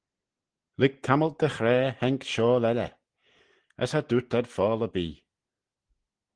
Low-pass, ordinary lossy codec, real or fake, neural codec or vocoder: 9.9 kHz; Opus, 16 kbps; real; none